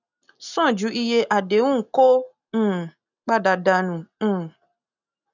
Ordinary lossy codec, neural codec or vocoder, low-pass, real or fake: none; none; 7.2 kHz; real